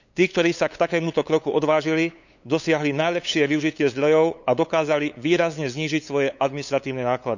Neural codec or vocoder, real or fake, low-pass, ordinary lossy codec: codec, 16 kHz, 8 kbps, FunCodec, trained on LibriTTS, 25 frames a second; fake; 7.2 kHz; none